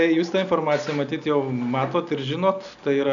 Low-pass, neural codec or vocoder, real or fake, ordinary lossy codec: 7.2 kHz; none; real; MP3, 96 kbps